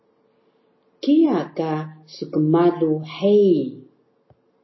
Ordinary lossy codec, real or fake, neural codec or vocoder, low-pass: MP3, 24 kbps; real; none; 7.2 kHz